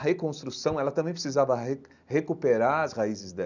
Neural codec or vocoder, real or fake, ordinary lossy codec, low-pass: none; real; none; 7.2 kHz